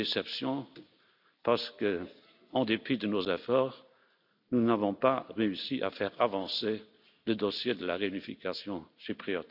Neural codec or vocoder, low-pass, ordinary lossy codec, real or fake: none; 5.4 kHz; none; real